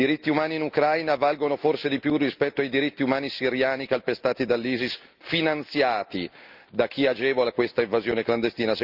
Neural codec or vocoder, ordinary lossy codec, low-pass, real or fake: none; Opus, 24 kbps; 5.4 kHz; real